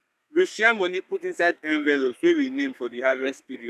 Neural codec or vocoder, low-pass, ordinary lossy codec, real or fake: codec, 32 kHz, 1.9 kbps, SNAC; 14.4 kHz; none; fake